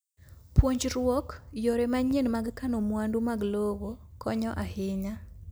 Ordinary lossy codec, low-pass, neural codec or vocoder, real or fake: none; none; none; real